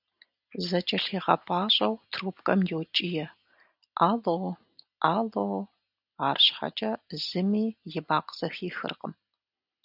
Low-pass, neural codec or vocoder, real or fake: 5.4 kHz; none; real